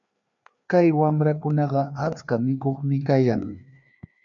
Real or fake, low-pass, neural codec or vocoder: fake; 7.2 kHz; codec, 16 kHz, 2 kbps, FreqCodec, larger model